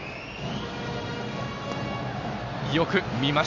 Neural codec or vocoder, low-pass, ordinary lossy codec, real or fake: none; 7.2 kHz; none; real